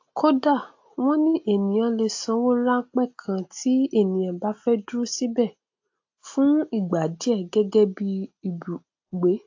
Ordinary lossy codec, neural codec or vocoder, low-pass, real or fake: AAC, 48 kbps; none; 7.2 kHz; real